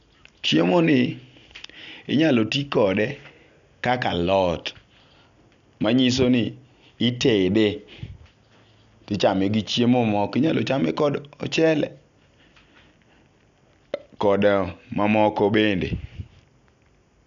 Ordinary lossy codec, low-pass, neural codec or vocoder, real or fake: none; 7.2 kHz; none; real